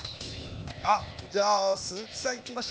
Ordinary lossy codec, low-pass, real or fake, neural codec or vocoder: none; none; fake; codec, 16 kHz, 0.8 kbps, ZipCodec